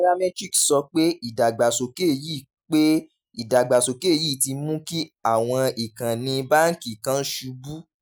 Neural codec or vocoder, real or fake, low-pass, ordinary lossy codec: none; real; none; none